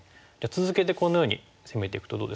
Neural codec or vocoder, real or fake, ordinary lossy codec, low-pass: none; real; none; none